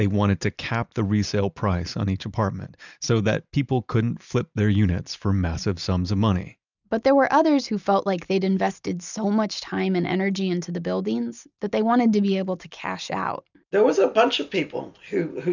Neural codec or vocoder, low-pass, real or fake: none; 7.2 kHz; real